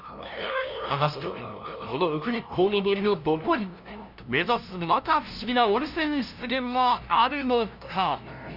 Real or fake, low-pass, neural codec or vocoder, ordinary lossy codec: fake; 5.4 kHz; codec, 16 kHz, 0.5 kbps, FunCodec, trained on LibriTTS, 25 frames a second; none